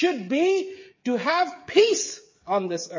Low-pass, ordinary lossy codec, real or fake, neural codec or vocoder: 7.2 kHz; MP3, 32 kbps; fake; codec, 16 kHz, 8 kbps, FreqCodec, smaller model